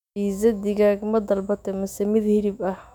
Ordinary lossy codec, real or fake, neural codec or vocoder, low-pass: none; fake; autoencoder, 48 kHz, 128 numbers a frame, DAC-VAE, trained on Japanese speech; 19.8 kHz